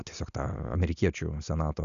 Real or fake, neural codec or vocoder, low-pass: real; none; 7.2 kHz